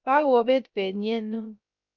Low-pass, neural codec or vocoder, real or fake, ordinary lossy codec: 7.2 kHz; codec, 16 kHz, 0.3 kbps, FocalCodec; fake; MP3, 64 kbps